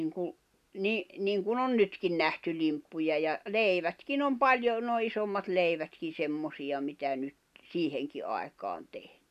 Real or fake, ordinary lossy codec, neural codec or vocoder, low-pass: real; none; none; 14.4 kHz